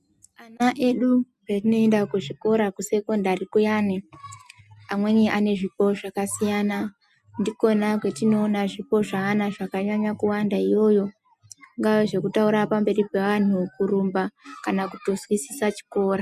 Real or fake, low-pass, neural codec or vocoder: real; 14.4 kHz; none